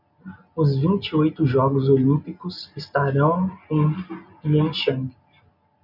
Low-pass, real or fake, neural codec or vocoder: 5.4 kHz; real; none